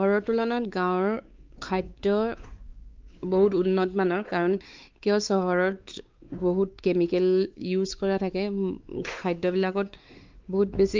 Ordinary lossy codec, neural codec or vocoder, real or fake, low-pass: Opus, 24 kbps; codec, 16 kHz, 4 kbps, X-Codec, WavLM features, trained on Multilingual LibriSpeech; fake; 7.2 kHz